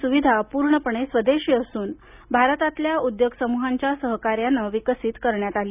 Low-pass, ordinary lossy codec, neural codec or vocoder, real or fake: 3.6 kHz; none; none; real